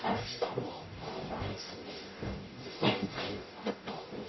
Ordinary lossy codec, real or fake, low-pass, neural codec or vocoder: MP3, 24 kbps; fake; 7.2 kHz; codec, 44.1 kHz, 0.9 kbps, DAC